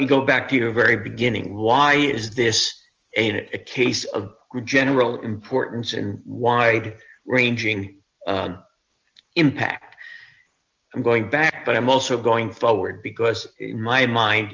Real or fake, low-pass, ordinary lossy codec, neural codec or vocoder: real; 7.2 kHz; Opus, 24 kbps; none